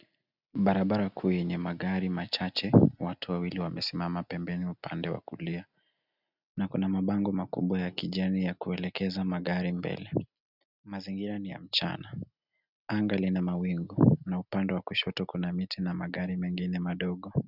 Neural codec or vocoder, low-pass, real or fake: none; 5.4 kHz; real